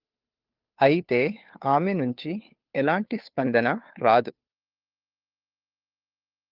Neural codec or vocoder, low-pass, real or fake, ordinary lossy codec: codec, 16 kHz, 2 kbps, FunCodec, trained on Chinese and English, 25 frames a second; 5.4 kHz; fake; Opus, 32 kbps